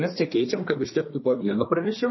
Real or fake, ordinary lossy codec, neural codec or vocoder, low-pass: fake; MP3, 24 kbps; codec, 24 kHz, 1 kbps, SNAC; 7.2 kHz